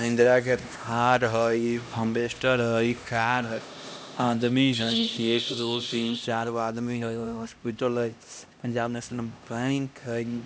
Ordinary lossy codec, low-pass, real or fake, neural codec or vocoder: none; none; fake; codec, 16 kHz, 1 kbps, X-Codec, HuBERT features, trained on LibriSpeech